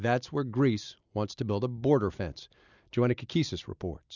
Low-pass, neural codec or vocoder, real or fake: 7.2 kHz; none; real